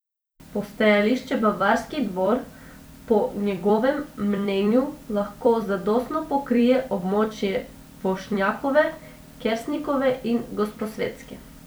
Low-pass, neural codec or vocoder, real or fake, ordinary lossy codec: none; vocoder, 44.1 kHz, 128 mel bands every 256 samples, BigVGAN v2; fake; none